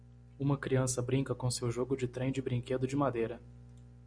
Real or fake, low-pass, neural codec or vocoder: real; 9.9 kHz; none